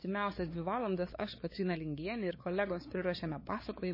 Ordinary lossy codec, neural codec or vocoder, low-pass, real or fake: MP3, 24 kbps; codec, 16 kHz, 4 kbps, X-Codec, WavLM features, trained on Multilingual LibriSpeech; 5.4 kHz; fake